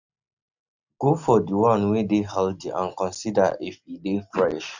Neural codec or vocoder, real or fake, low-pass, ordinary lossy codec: none; real; 7.2 kHz; none